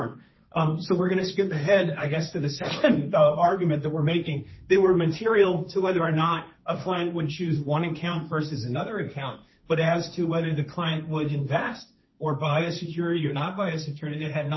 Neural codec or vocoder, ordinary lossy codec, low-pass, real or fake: codec, 16 kHz, 1.1 kbps, Voila-Tokenizer; MP3, 24 kbps; 7.2 kHz; fake